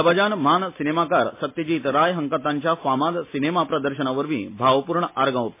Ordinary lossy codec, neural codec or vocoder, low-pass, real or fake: MP3, 24 kbps; none; 3.6 kHz; real